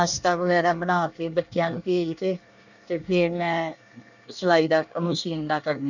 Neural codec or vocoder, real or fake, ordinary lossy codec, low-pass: codec, 24 kHz, 1 kbps, SNAC; fake; none; 7.2 kHz